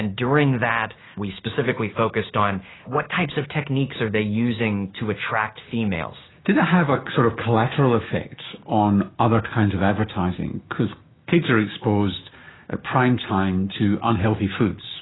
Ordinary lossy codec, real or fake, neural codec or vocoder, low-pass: AAC, 16 kbps; fake; codec, 16 kHz, 6 kbps, DAC; 7.2 kHz